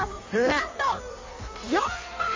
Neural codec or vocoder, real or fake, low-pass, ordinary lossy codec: codec, 16 kHz in and 24 kHz out, 1.1 kbps, FireRedTTS-2 codec; fake; 7.2 kHz; MP3, 32 kbps